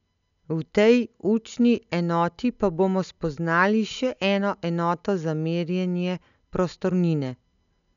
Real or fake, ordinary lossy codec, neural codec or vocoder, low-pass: real; none; none; 7.2 kHz